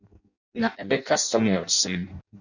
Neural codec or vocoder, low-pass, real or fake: codec, 16 kHz in and 24 kHz out, 0.6 kbps, FireRedTTS-2 codec; 7.2 kHz; fake